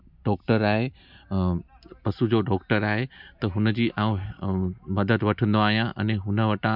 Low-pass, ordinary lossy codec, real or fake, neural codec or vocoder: 5.4 kHz; none; real; none